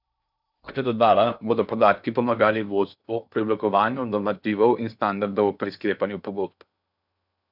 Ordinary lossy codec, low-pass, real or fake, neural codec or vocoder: none; 5.4 kHz; fake; codec, 16 kHz in and 24 kHz out, 0.8 kbps, FocalCodec, streaming, 65536 codes